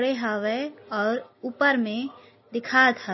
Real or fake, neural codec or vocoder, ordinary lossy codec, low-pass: real; none; MP3, 24 kbps; 7.2 kHz